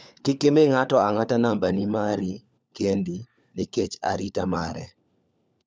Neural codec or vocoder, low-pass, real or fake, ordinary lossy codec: codec, 16 kHz, 4 kbps, FunCodec, trained on LibriTTS, 50 frames a second; none; fake; none